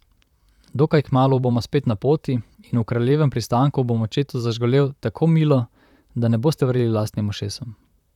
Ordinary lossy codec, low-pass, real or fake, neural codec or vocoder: none; 19.8 kHz; fake; vocoder, 44.1 kHz, 128 mel bands, Pupu-Vocoder